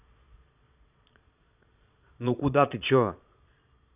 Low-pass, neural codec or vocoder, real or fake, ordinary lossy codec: 3.6 kHz; vocoder, 22.05 kHz, 80 mel bands, Vocos; fake; none